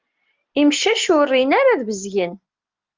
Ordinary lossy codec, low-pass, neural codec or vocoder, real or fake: Opus, 32 kbps; 7.2 kHz; none; real